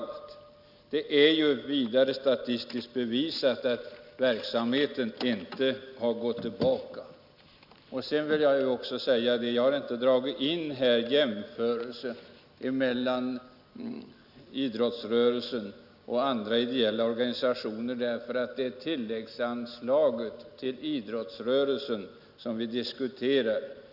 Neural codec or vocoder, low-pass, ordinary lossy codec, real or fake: none; 5.4 kHz; none; real